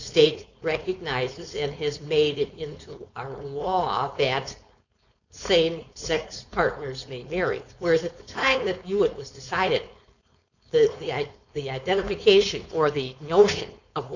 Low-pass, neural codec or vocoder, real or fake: 7.2 kHz; codec, 16 kHz, 4.8 kbps, FACodec; fake